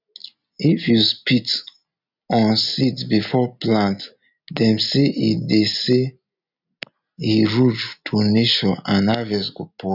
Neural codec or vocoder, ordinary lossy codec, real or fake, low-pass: none; none; real; 5.4 kHz